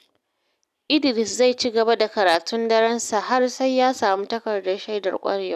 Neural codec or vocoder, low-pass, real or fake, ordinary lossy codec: none; 14.4 kHz; real; none